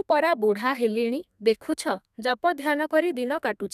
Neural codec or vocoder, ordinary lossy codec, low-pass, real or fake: codec, 32 kHz, 1.9 kbps, SNAC; none; 14.4 kHz; fake